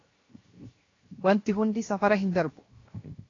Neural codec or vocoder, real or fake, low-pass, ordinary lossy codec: codec, 16 kHz, 0.7 kbps, FocalCodec; fake; 7.2 kHz; AAC, 32 kbps